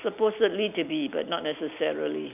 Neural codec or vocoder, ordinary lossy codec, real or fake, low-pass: none; none; real; 3.6 kHz